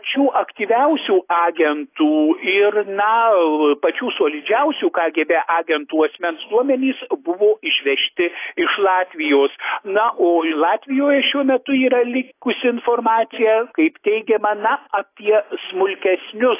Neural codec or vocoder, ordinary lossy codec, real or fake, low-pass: none; AAC, 24 kbps; real; 3.6 kHz